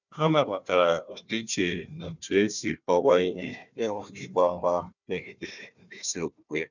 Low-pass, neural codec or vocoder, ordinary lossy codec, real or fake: 7.2 kHz; codec, 16 kHz, 1 kbps, FunCodec, trained on Chinese and English, 50 frames a second; none; fake